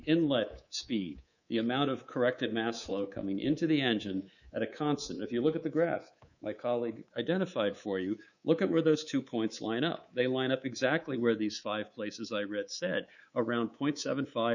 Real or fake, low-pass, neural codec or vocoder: fake; 7.2 kHz; codec, 24 kHz, 3.1 kbps, DualCodec